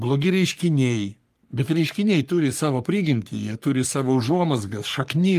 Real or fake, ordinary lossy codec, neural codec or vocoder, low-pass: fake; Opus, 32 kbps; codec, 44.1 kHz, 3.4 kbps, Pupu-Codec; 14.4 kHz